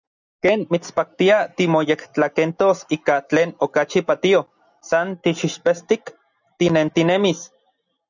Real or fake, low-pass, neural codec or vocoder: real; 7.2 kHz; none